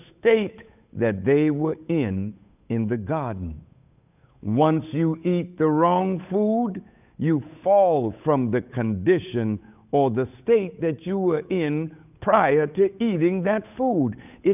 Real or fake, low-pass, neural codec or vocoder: fake; 3.6 kHz; codec, 16 kHz, 8 kbps, FunCodec, trained on Chinese and English, 25 frames a second